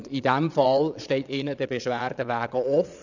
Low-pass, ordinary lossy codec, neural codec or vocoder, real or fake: 7.2 kHz; none; vocoder, 22.05 kHz, 80 mel bands, Vocos; fake